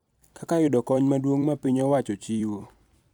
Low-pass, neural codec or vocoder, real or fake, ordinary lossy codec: 19.8 kHz; vocoder, 44.1 kHz, 128 mel bands every 256 samples, BigVGAN v2; fake; none